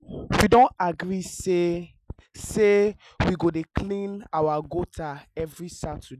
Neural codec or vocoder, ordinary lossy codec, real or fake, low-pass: none; none; real; 14.4 kHz